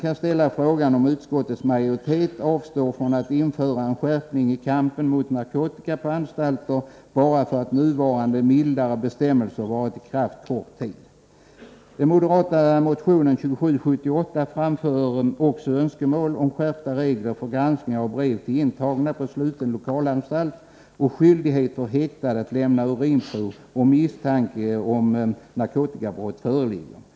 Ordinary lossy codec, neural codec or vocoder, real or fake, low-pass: none; none; real; none